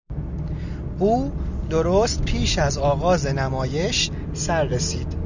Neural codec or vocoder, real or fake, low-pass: none; real; 7.2 kHz